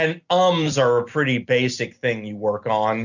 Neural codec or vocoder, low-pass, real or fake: none; 7.2 kHz; real